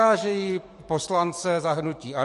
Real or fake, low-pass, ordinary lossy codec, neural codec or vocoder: fake; 14.4 kHz; MP3, 48 kbps; codec, 44.1 kHz, 7.8 kbps, DAC